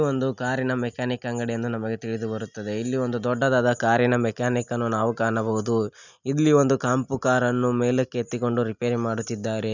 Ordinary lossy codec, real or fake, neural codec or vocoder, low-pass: none; real; none; 7.2 kHz